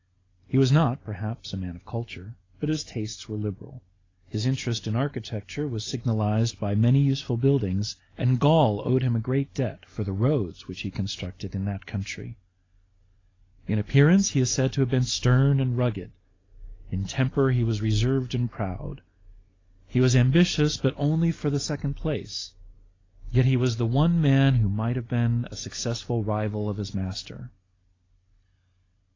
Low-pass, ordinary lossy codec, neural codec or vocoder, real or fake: 7.2 kHz; AAC, 32 kbps; none; real